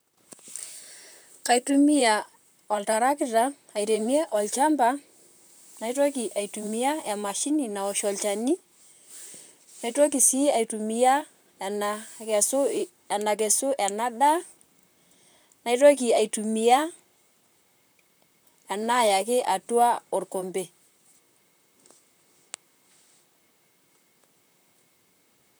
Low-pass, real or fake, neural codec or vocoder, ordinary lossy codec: none; fake; vocoder, 44.1 kHz, 128 mel bands, Pupu-Vocoder; none